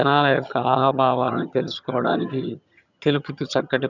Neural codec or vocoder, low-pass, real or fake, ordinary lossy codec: vocoder, 22.05 kHz, 80 mel bands, HiFi-GAN; 7.2 kHz; fake; none